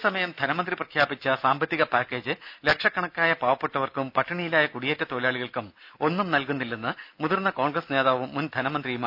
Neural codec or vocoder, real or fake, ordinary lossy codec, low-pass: none; real; none; 5.4 kHz